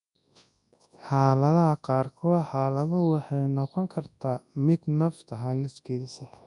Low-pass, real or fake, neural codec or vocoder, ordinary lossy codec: 10.8 kHz; fake; codec, 24 kHz, 0.9 kbps, WavTokenizer, large speech release; none